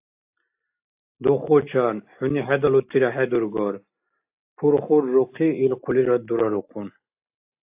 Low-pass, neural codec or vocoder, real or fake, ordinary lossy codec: 3.6 kHz; none; real; AAC, 32 kbps